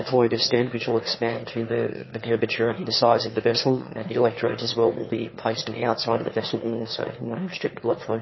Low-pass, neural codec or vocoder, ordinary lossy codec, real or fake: 7.2 kHz; autoencoder, 22.05 kHz, a latent of 192 numbers a frame, VITS, trained on one speaker; MP3, 24 kbps; fake